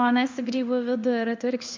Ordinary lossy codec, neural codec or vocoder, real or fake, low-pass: MP3, 64 kbps; codec, 16 kHz in and 24 kHz out, 1 kbps, XY-Tokenizer; fake; 7.2 kHz